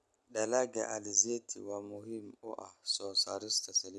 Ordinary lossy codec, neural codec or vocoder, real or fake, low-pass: none; none; real; none